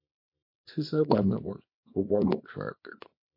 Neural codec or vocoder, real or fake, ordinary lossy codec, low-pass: codec, 24 kHz, 0.9 kbps, WavTokenizer, small release; fake; MP3, 32 kbps; 5.4 kHz